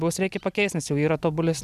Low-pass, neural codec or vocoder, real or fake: 14.4 kHz; none; real